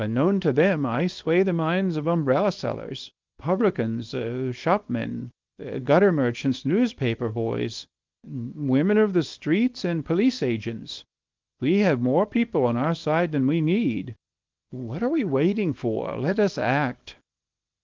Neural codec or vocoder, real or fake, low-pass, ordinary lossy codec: codec, 24 kHz, 0.9 kbps, WavTokenizer, small release; fake; 7.2 kHz; Opus, 32 kbps